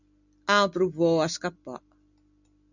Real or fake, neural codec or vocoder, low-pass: real; none; 7.2 kHz